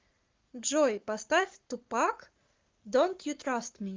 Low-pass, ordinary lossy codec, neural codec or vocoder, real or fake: 7.2 kHz; Opus, 24 kbps; codec, 44.1 kHz, 7.8 kbps, Pupu-Codec; fake